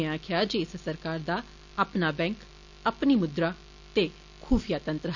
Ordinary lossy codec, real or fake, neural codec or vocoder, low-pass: none; real; none; 7.2 kHz